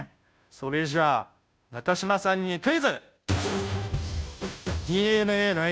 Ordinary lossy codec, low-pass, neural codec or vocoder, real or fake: none; none; codec, 16 kHz, 0.5 kbps, FunCodec, trained on Chinese and English, 25 frames a second; fake